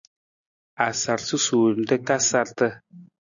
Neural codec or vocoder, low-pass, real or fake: none; 7.2 kHz; real